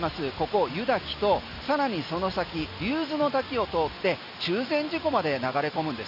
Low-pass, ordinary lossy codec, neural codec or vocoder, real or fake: 5.4 kHz; none; none; real